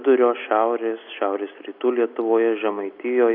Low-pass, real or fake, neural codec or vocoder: 5.4 kHz; real; none